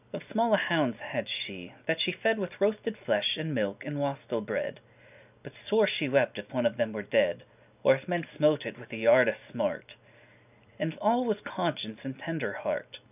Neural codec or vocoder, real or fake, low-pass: none; real; 3.6 kHz